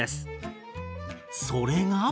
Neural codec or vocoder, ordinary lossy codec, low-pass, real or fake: none; none; none; real